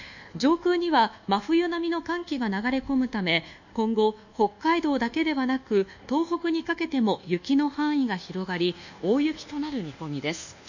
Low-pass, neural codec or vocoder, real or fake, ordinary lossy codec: 7.2 kHz; codec, 24 kHz, 1.2 kbps, DualCodec; fake; none